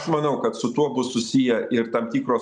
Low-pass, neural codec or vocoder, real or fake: 10.8 kHz; none; real